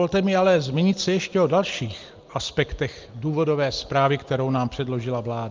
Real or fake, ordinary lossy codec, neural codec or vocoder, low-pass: real; Opus, 32 kbps; none; 7.2 kHz